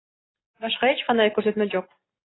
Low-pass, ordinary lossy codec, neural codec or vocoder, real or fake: 7.2 kHz; AAC, 16 kbps; none; real